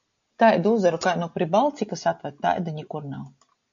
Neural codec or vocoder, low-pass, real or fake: none; 7.2 kHz; real